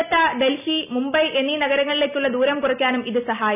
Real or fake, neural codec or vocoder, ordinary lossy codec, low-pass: real; none; none; 3.6 kHz